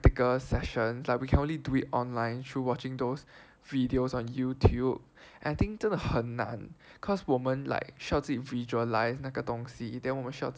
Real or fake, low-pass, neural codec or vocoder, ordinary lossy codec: real; none; none; none